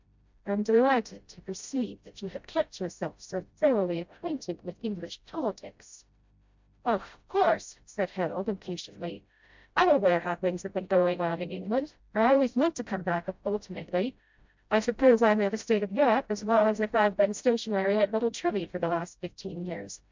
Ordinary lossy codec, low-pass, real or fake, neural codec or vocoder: MP3, 64 kbps; 7.2 kHz; fake; codec, 16 kHz, 0.5 kbps, FreqCodec, smaller model